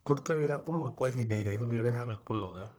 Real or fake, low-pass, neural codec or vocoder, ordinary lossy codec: fake; none; codec, 44.1 kHz, 1.7 kbps, Pupu-Codec; none